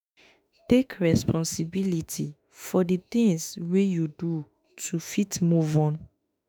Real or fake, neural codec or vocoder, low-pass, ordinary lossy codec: fake; autoencoder, 48 kHz, 32 numbers a frame, DAC-VAE, trained on Japanese speech; none; none